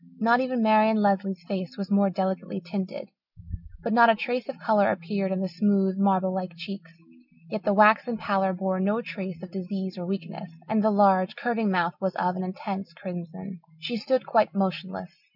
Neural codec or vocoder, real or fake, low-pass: none; real; 5.4 kHz